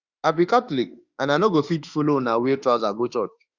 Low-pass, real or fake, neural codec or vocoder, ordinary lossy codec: 7.2 kHz; fake; autoencoder, 48 kHz, 32 numbers a frame, DAC-VAE, trained on Japanese speech; Opus, 64 kbps